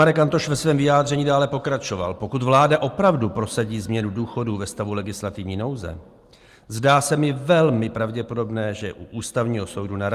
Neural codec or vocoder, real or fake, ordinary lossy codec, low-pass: none; real; Opus, 32 kbps; 14.4 kHz